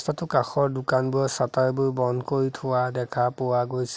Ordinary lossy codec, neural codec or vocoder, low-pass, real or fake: none; none; none; real